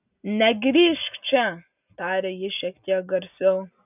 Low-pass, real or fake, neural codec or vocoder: 3.6 kHz; real; none